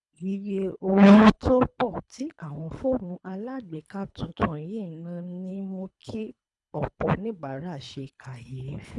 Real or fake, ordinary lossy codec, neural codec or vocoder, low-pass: fake; none; codec, 24 kHz, 3 kbps, HILCodec; 10.8 kHz